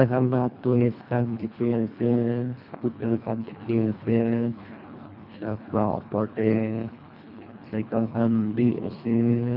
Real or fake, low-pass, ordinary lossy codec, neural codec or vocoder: fake; 5.4 kHz; none; codec, 24 kHz, 1.5 kbps, HILCodec